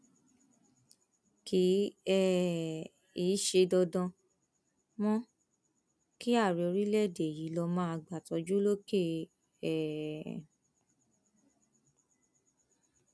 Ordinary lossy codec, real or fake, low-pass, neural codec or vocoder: none; real; none; none